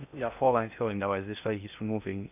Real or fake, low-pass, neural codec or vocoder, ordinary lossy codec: fake; 3.6 kHz; codec, 16 kHz in and 24 kHz out, 0.6 kbps, FocalCodec, streaming, 2048 codes; none